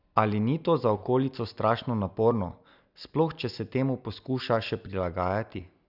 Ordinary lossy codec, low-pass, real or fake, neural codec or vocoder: none; 5.4 kHz; real; none